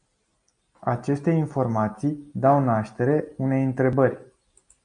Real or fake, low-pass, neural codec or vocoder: real; 9.9 kHz; none